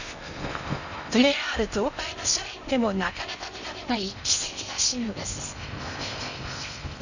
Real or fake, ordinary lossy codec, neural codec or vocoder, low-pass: fake; none; codec, 16 kHz in and 24 kHz out, 0.6 kbps, FocalCodec, streaming, 4096 codes; 7.2 kHz